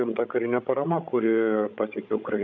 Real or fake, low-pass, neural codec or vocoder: fake; 7.2 kHz; codec, 16 kHz, 16 kbps, FreqCodec, larger model